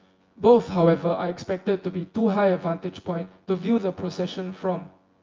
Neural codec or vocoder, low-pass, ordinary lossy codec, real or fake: vocoder, 24 kHz, 100 mel bands, Vocos; 7.2 kHz; Opus, 32 kbps; fake